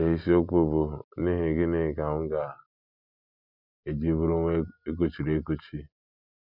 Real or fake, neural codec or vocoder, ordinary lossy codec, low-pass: real; none; none; 5.4 kHz